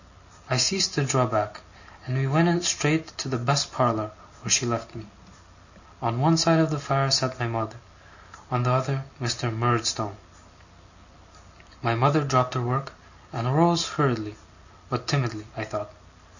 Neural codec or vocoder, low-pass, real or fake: none; 7.2 kHz; real